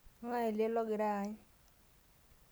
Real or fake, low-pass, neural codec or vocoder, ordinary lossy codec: real; none; none; none